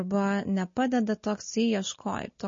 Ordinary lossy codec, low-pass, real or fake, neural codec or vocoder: MP3, 32 kbps; 7.2 kHz; real; none